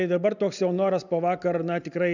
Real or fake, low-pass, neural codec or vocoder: real; 7.2 kHz; none